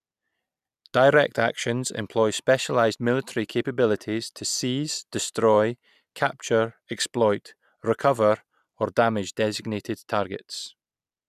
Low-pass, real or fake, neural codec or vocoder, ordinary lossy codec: 14.4 kHz; real; none; none